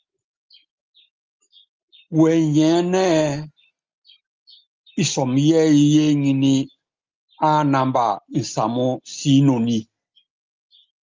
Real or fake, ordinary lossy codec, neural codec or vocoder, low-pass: real; Opus, 24 kbps; none; 7.2 kHz